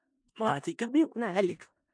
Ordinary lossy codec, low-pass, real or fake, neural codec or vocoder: MP3, 64 kbps; 9.9 kHz; fake; codec, 16 kHz in and 24 kHz out, 0.4 kbps, LongCat-Audio-Codec, four codebook decoder